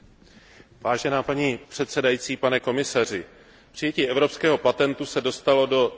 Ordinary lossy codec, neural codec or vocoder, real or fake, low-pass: none; none; real; none